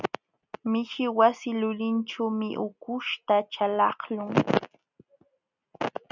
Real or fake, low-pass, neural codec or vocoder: real; 7.2 kHz; none